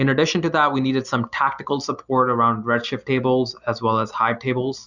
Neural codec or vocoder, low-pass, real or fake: none; 7.2 kHz; real